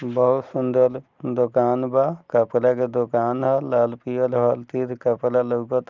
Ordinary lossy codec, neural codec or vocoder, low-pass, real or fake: Opus, 32 kbps; none; 7.2 kHz; real